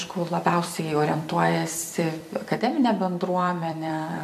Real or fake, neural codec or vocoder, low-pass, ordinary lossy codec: fake; vocoder, 44.1 kHz, 128 mel bands every 256 samples, BigVGAN v2; 14.4 kHz; MP3, 64 kbps